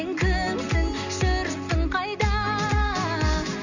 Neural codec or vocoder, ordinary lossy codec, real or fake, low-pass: none; none; real; 7.2 kHz